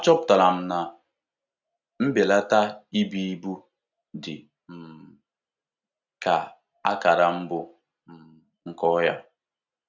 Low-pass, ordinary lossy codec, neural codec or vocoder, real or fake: 7.2 kHz; none; none; real